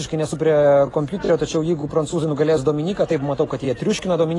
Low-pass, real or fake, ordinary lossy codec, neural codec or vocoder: 10.8 kHz; real; AAC, 32 kbps; none